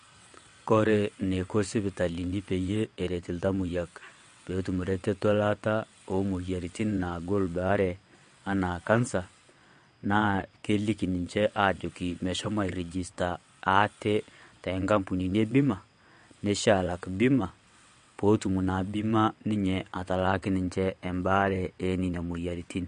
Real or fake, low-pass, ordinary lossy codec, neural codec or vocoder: fake; 9.9 kHz; MP3, 48 kbps; vocoder, 22.05 kHz, 80 mel bands, WaveNeXt